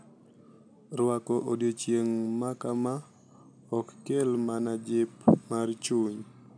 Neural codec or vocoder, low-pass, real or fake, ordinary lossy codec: none; 9.9 kHz; real; MP3, 96 kbps